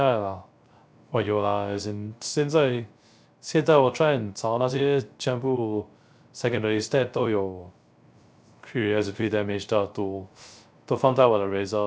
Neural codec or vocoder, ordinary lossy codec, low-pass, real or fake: codec, 16 kHz, 0.3 kbps, FocalCodec; none; none; fake